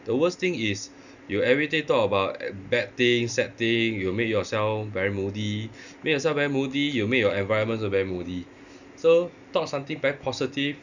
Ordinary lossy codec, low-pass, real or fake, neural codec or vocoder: none; 7.2 kHz; real; none